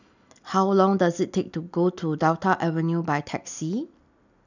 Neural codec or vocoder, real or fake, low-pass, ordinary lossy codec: none; real; 7.2 kHz; none